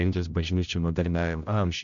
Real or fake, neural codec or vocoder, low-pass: fake; codec, 16 kHz, 0.5 kbps, X-Codec, HuBERT features, trained on general audio; 7.2 kHz